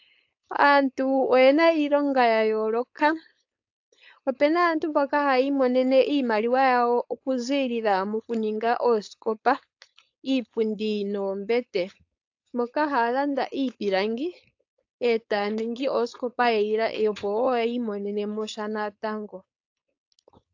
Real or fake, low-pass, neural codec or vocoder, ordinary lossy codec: fake; 7.2 kHz; codec, 16 kHz, 4.8 kbps, FACodec; AAC, 48 kbps